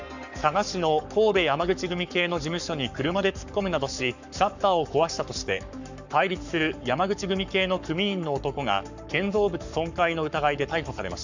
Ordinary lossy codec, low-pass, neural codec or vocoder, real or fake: none; 7.2 kHz; codec, 44.1 kHz, 7.8 kbps, Pupu-Codec; fake